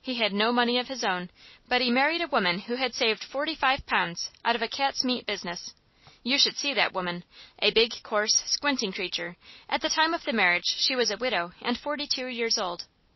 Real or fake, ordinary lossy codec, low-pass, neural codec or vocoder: real; MP3, 24 kbps; 7.2 kHz; none